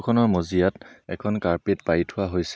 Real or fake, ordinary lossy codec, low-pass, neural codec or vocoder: real; none; none; none